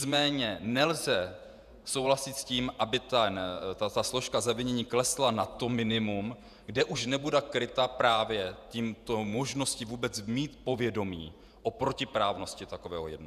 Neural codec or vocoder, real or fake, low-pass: vocoder, 48 kHz, 128 mel bands, Vocos; fake; 14.4 kHz